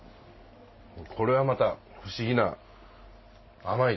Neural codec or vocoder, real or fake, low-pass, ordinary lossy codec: none; real; 7.2 kHz; MP3, 24 kbps